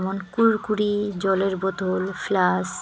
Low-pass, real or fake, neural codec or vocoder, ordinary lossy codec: none; real; none; none